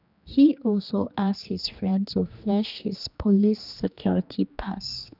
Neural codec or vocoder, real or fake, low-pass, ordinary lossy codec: codec, 16 kHz, 2 kbps, X-Codec, HuBERT features, trained on general audio; fake; 5.4 kHz; none